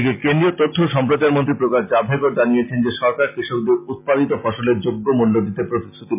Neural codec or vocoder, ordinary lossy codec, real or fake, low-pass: none; MP3, 32 kbps; real; 3.6 kHz